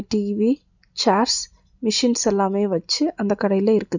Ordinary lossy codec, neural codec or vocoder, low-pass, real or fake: none; none; 7.2 kHz; real